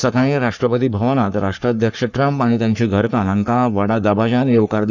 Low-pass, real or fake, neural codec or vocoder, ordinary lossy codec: 7.2 kHz; fake; codec, 44.1 kHz, 3.4 kbps, Pupu-Codec; none